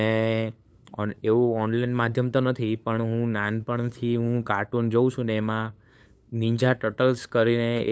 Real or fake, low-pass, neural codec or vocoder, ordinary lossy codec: fake; none; codec, 16 kHz, 8 kbps, FunCodec, trained on LibriTTS, 25 frames a second; none